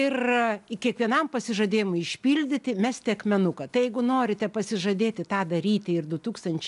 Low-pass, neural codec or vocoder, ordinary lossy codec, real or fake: 10.8 kHz; none; MP3, 96 kbps; real